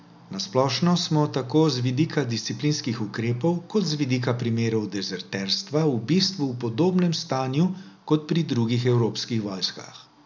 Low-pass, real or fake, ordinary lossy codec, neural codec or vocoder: 7.2 kHz; real; none; none